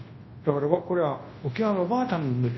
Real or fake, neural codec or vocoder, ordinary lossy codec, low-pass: fake; codec, 24 kHz, 0.9 kbps, WavTokenizer, large speech release; MP3, 24 kbps; 7.2 kHz